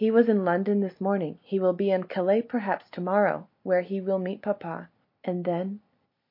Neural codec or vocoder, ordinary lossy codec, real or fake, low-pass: none; MP3, 32 kbps; real; 5.4 kHz